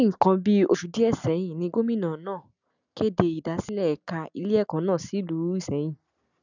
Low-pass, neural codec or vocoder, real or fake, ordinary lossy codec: 7.2 kHz; none; real; none